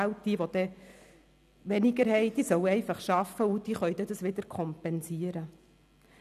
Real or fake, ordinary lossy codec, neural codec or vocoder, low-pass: real; none; none; 14.4 kHz